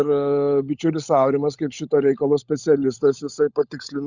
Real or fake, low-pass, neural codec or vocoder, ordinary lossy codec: fake; 7.2 kHz; codec, 16 kHz, 16 kbps, FunCodec, trained on LibriTTS, 50 frames a second; Opus, 64 kbps